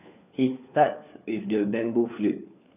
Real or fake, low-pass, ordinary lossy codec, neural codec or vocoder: fake; 3.6 kHz; none; codec, 16 kHz, 4 kbps, FunCodec, trained on LibriTTS, 50 frames a second